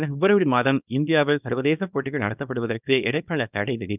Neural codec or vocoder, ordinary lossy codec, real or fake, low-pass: codec, 24 kHz, 0.9 kbps, WavTokenizer, small release; none; fake; 3.6 kHz